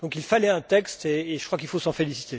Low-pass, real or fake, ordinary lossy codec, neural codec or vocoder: none; real; none; none